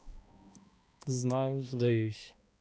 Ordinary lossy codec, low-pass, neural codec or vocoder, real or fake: none; none; codec, 16 kHz, 1 kbps, X-Codec, HuBERT features, trained on balanced general audio; fake